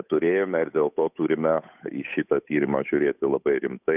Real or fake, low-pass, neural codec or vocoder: fake; 3.6 kHz; codec, 16 kHz, 8 kbps, FunCodec, trained on Chinese and English, 25 frames a second